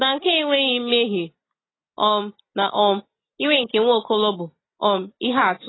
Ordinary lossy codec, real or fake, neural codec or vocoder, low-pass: AAC, 16 kbps; real; none; 7.2 kHz